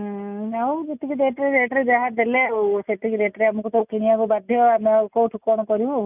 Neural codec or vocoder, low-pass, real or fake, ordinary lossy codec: none; 3.6 kHz; real; none